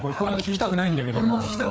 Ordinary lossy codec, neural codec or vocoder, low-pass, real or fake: none; codec, 16 kHz, 4 kbps, FreqCodec, larger model; none; fake